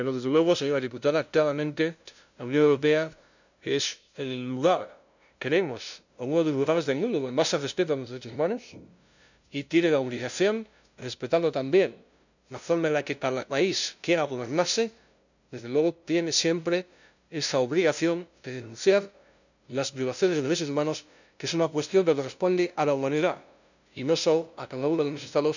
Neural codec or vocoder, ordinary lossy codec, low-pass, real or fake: codec, 16 kHz, 0.5 kbps, FunCodec, trained on LibriTTS, 25 frames a second; none; 7.2 kHz; fake